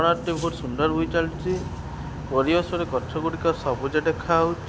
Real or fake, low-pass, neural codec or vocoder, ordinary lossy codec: real; none; none; none